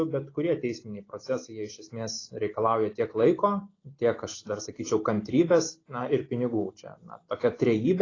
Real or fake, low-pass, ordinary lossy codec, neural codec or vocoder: real; 7.2 kHz; AAC, 32 kbps; none